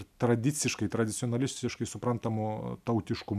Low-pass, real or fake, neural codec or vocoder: 14.4 kHz; real; none